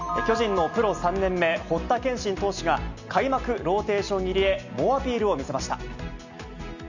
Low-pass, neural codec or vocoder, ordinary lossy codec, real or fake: 7.2 kHz; none; none; real